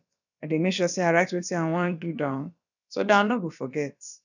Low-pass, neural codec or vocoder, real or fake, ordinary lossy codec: 7.2 kHz; codec, 16 kHz, about 1 kbps, DyCAST, with the encoder's durations; fake; none